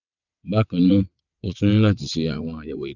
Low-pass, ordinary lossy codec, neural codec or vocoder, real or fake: 7.2 kHz; none; vocoder, 22.05 kHz, 80 mel bands, WaveNeXt; fake